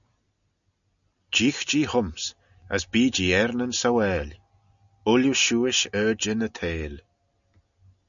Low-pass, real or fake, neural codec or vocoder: 7.2 kHz; real; none